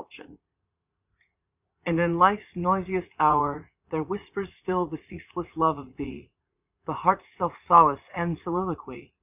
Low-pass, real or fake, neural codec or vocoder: 3.6 kHz; fake; vocoder, 44.1 kHz, 80 mel bands, Vocos